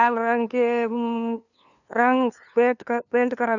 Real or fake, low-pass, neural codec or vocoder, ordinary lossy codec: fake; 7.2 kHz; codec, 16 kHz, 2 kbps, FunCodec, trained on LibriTTS, 25 frames a second; none